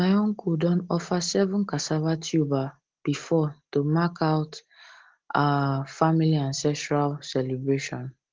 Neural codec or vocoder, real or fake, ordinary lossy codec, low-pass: none; real; Opus, 16 kbps; 7.2 kHz